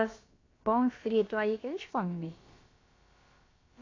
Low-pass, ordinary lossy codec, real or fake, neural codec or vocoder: 7.2 kHz; AAC, 32 kbps; fake; codec, 16 kHz, about 1 kbps, DyCAST, with the encoder's durations